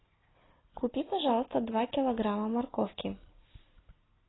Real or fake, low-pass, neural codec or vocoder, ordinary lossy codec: real; 7.2 kHz; none; AAC, 16 kbps